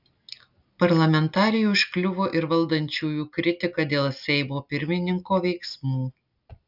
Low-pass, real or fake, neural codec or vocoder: 5.4 kHz; real; none